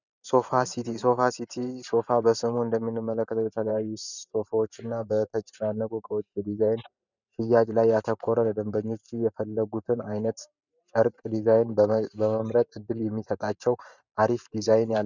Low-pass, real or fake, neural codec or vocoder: 7.2 kHz; fake; vocoder, 44.1 kHz, 128 mel bands every 512 samples, BigVGAN v2